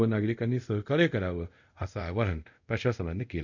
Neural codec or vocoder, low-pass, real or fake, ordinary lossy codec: codec, 24 kHz, 0.5 kbps, DualCodec; 7.2 kHz; fake; none